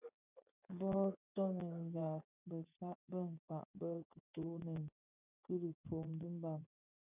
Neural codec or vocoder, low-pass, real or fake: vocoder, 24 kHz, 100 mel bands, Vocos; 3.6 kHz; fake